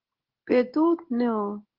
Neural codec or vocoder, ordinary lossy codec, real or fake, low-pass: codec, 16 kHz in and 24 kHz out, 1 kbps, XY-Tokenizer; Opus, 16 kbps; fake; 5.4 kHz